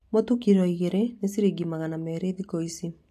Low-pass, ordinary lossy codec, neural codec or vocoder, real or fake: 14.4 kHz; AAC, 64 kbps; none; real